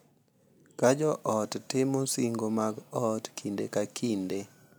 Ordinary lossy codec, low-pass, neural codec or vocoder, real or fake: none; none; none; real